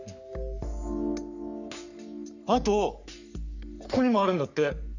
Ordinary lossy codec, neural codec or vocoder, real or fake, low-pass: none; codec, 44.1 kHz, 3.4 kbps, Pupu-Codec; fake; 7.2 kHz